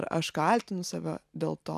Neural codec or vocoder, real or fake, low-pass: none; real; 14.4 kHz